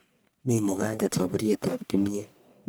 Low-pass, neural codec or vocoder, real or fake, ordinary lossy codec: none; codec, 44.1 kHz, 1.7 kbps, Pupu-Codec; fake; none